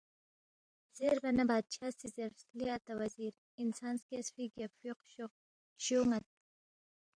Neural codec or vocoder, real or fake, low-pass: vocoder, 44.1 kHz, 128 mel bands every 512 samples, BigVGAN v2; fake; 9.9 kHz